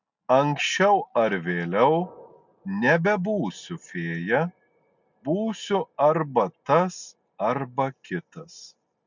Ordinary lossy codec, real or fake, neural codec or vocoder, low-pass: MP3, 64 kbps; real; none; 7.2 kHz